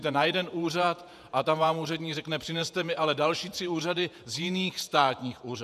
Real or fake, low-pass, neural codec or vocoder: fake; 14.4 kHz; vocoder, 48 kHz, 128 mel bands, Vocos